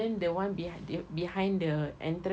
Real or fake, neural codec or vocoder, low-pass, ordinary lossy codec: real; none; none; none